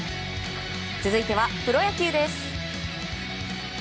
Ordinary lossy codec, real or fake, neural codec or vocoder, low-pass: none; real; none; none